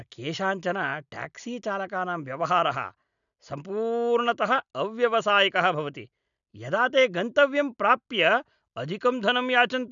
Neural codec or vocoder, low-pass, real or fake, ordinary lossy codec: none; 7.2 kHz; real; none